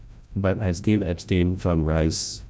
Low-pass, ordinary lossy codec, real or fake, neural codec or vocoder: none; none; fake; codec, 16 kHz, 0.5 kbps, FreqCodec, larger model